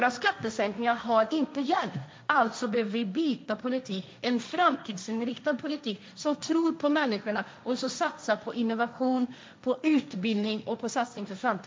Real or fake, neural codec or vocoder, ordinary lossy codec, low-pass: fake; codec, 16 kHz, 1.1 kbps, Voila-Tokenizer; none; none